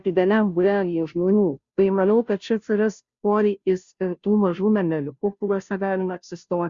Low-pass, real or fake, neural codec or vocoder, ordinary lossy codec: 7.2 kHz; fake; codec, 16 kHz, 0.5 kbps, FunCodec, trained on Chinese and English, 25 frames a second; Opus, 64 kbps